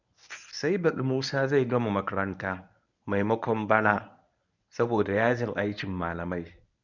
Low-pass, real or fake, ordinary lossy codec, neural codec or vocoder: 7.2 kHz; fake; none; codec, 24 kHz, 0.9 kbps, WavTokenizer, medium speech release version 1